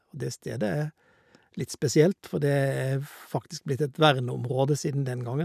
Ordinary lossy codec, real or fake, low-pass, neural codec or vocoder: none; real; 14.4 kHz; none